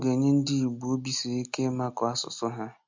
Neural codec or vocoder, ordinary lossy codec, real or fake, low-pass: none; MP3, 64 kbps; real; 7.2 kHz